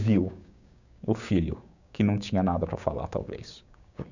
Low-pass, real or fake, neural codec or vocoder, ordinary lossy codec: 7.2 kHz; fake; codec, 16 kHz, 6 kbps, DAC; none